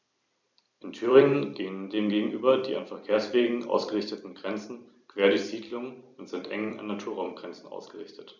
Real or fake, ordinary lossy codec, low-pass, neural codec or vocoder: fake; none; 7.2 kHz; vocoder, 44.1 kHz, 128 mel bands every 256 samples, BigVGAN v2